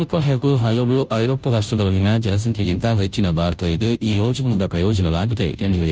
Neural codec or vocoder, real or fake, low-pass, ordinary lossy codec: codec, 16 kHz, 0.5 kbps, FunCodec, trained on Chinese and English, 25 frames a second; fake; none; none